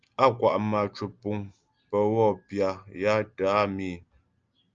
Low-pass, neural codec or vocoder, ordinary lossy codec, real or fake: 7.2 kHz; none; Opus, 24 kbps; real